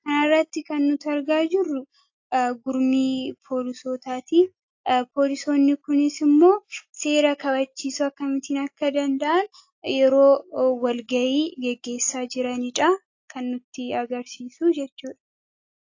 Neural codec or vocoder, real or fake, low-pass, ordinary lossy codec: none; real; 7.2 kHz; AAC, 48 kbps